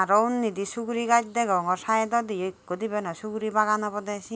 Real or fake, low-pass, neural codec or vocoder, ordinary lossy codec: real; none; none; none